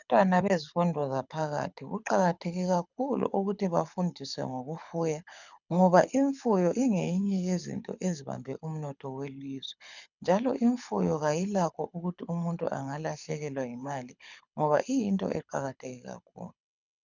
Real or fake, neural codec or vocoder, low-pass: fake; codec, 24 kHz, 6 kbps, HILCodec; 7.2 kHz